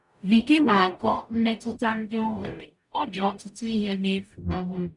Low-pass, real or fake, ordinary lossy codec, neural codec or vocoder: 10.8 kHz; fake; none; codec, 44.1 kHz, 0.9 kbps, DAC